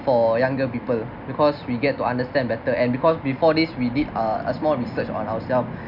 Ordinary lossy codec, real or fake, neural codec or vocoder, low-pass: none; real; none; 5.4 kHz